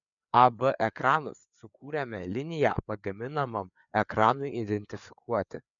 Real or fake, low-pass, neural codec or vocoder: fake; 7.2 kHz; codec, 16 kHz, 4 kbps, FreqCodec, larger model